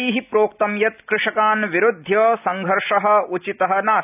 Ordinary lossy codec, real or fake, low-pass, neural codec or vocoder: none; real; 3.6 kHz; none